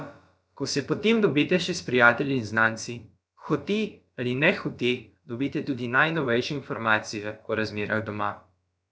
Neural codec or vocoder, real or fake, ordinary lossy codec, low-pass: codec, 16 kHz, about 1 kbps, DyCAST, with the encoder's durations; fake; none; none